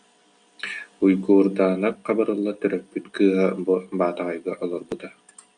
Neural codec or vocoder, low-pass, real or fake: none; 9.9 kHz; real